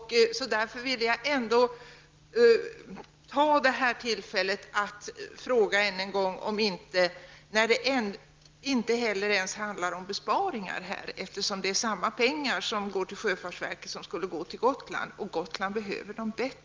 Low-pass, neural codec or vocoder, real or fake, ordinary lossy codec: 7.2 kHz; none; real; Opus, 32 kbps